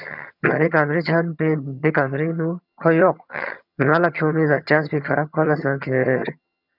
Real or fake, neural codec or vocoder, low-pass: fake; vocoder, 22.05 kHz, 80 mel bands, HiFi-GAN; 5.4 kHz